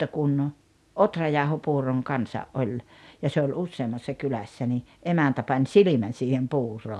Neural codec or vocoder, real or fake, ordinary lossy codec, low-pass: none; real; none; none